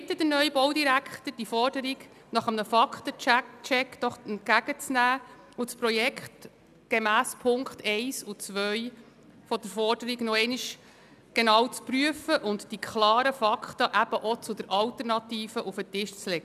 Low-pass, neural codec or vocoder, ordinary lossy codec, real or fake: 14.4 kHz; none; none; real